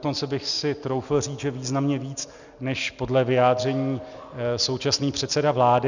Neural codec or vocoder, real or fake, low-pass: none; real; 7.2 kHz